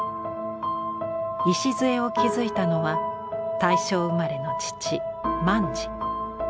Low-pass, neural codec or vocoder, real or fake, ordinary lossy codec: none; none; real; none